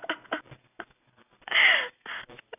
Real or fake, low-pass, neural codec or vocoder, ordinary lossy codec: real; 3.6 kHz; none; none